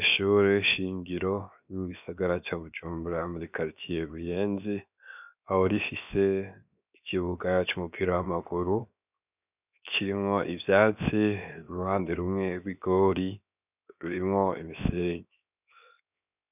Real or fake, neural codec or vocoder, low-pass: fake; codec, 16 kHz, 0.7 kbps, FocalCodec; 3.6 kHz